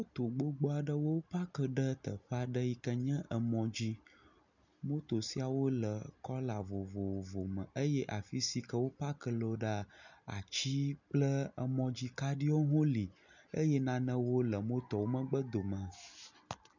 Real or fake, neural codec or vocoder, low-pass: real; none; 7.2 kHz